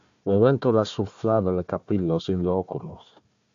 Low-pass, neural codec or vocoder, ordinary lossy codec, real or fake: 7.2 kHz; codec, 16 kHz, 1 kbps, FunCodec, trained on Chinese and English, 50 frames a second; AAC, 64 kbps; fake